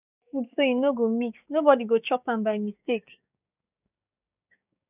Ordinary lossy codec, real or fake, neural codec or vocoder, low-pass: none; fake; codec, 16 kHz, 6 kbps, DAC; 3.6 kHz